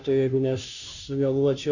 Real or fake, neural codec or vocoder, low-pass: fake; codec, 16 kHz, 0.5 kbps, FunCodec, trained on Chinese and English, 25 frames a second; 7.2 kHz